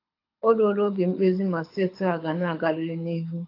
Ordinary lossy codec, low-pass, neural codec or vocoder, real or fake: AAC, 32 kbps; 5.4 kHz; codec, 24 kHz, 6 kbps, HILCodec; fake